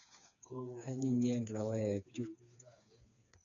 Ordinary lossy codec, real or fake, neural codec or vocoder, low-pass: none; fake; codec, 16 kHz, 4 kbps, FreqCodec, smaller model; 7.2 kHz